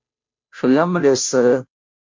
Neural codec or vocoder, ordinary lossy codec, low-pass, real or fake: codec, 16 kHz, 0.5 kbps, FunCodec, trained on Chinese and English, 25 frames a second; MP3, 48 kbps; 7.2 kHz; fake